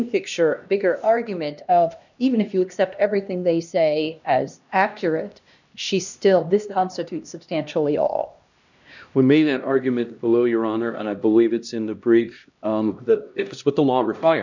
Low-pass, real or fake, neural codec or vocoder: 7.2 kHz; fake; codec, 16 kHz, 1 kbps, X-Codec, HuBERT features, trained on LibriSpeech